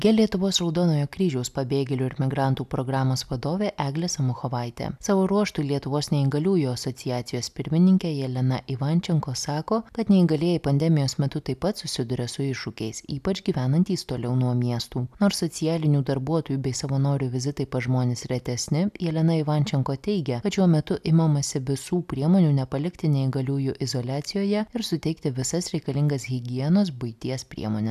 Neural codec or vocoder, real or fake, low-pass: none; real; 14.4 kHz